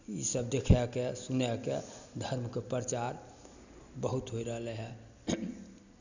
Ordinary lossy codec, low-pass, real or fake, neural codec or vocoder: none; 7.2 kHz; real; none